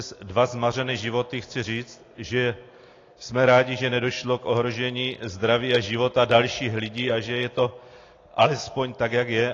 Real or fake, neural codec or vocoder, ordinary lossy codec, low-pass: real; none; AAC, 32 kbps; 7.2 kHz